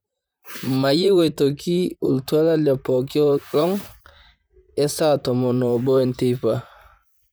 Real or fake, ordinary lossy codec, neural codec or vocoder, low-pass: fake; none; vocoder, 44.1 kHz, 128 mel bands, Pupu-Vocoder; none